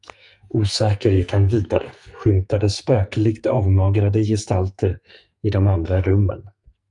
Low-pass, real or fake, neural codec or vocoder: 10.8 kHz; fake; codec, 44.1 kHz, 2.6 kbps, SNAC